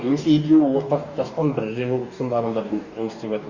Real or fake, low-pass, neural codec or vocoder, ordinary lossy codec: fake; 7.2 kHz; codec, 44.1 kHz, 2.6 kbps, DAC; Opus, 64 kbps